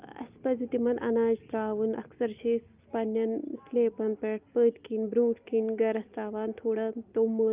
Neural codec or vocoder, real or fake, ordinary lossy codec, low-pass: none; real; Opus, 24 kbps; 3.6 kHz